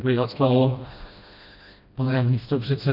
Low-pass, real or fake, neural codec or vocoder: 5.4 kHz; fake; codec, 16 kHz, 1 kbps, FreqCodec, smaller model